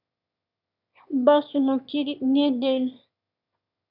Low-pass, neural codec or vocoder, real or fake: 5.4 kHz; autoencoder, 22.05 kHz, a latent of 192 numbers a frame, VITS, trained on one speaker; fake